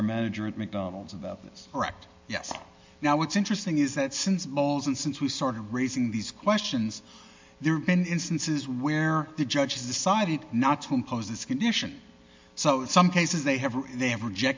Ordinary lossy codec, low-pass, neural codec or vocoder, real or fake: MP3, 64 kbps; 7.2 kHz; none; real